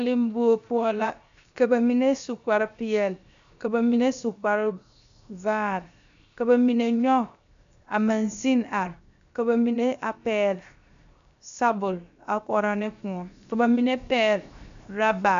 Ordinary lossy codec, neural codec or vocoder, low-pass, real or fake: MP3, 64 kbps; codec, 16 kHz, 0.7 kbps, FocalCodec; 7.2 kHz; fake